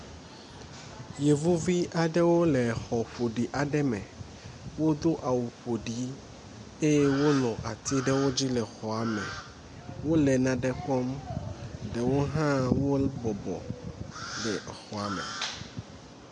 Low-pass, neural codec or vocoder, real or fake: 10.8 kHz; none; real